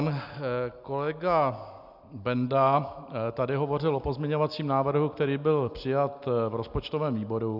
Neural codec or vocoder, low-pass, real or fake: none; 5.4 kHz; real